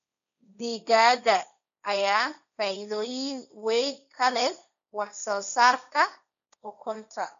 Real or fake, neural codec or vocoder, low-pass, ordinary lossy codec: fake; codec, 16 kHz, 1.1 kbps, Voila-Tokenizer; 7.2 kHz; none